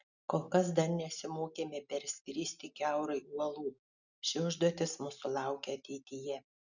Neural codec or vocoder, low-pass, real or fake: none; 7.2 kHz; real